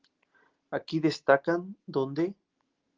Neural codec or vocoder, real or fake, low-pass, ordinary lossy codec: none; real; 7.2 kHz; Opus, 16 kbps